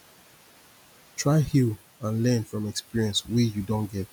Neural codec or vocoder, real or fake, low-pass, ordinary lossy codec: none; real; none; none